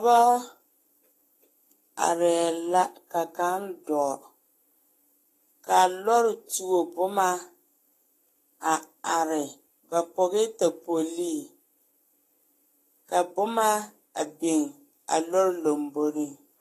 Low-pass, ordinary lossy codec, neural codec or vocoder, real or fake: 14.4 kHz; AAC, 48 kbps; codec, 44.1 kHz, 7.8 kbps, Pupu-Codec; fake